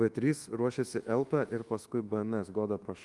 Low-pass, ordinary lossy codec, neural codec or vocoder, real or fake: 10.8 kHz; Opus, 24 kbps; codec, 24 kHz, 1.2 kbps, DualCodec; fake